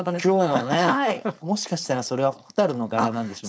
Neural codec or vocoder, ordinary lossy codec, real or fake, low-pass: codec, 16 kHz, 4.8 kbps, FACodec; none; fake; none